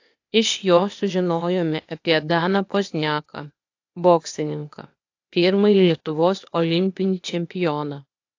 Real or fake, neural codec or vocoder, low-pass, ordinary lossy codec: fake; codec, 16 kHz, 0.8 kbps, ZipCodec; 7.2 kHz; AAC, 48 kbps